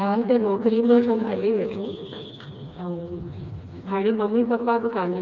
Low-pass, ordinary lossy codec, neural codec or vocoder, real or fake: 7.2 kHz; none; codec, 16 kHz, 2 kbps, FreqCodec, smaller model; fake